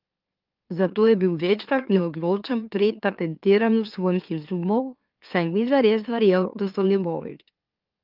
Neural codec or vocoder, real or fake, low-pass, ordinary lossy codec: autoencoder, 44.1 kHz, a latent of 192 numbers a frame, MeloTTS; fake; 5.4 kHz; Opus, 24 kbps